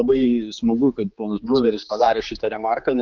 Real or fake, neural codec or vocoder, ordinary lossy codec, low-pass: fake; codec, 16 kHz, 4 kbps, X-Codec, HuBERT features, trained on general audio; Opus, 24 kbps; 7.2 kHz